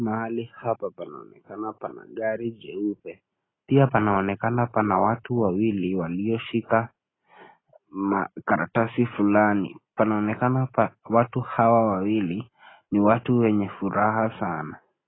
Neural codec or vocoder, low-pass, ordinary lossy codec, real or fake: none; 7.2 kHz; AAC, 16 kbps; real